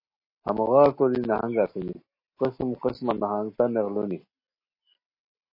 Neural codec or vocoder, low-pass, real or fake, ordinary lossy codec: none; 5.4 kHz; real; MP3, 24 kbps